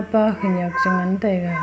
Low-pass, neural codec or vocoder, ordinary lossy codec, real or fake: none; none; none; real